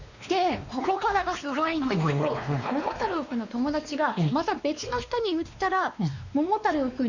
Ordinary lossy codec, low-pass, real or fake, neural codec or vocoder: none; 7.2 kHz; fake; codec, 16 kHz, 2 kbps, X-Codec, WavLM features, trained on Multilingual LibriSpeech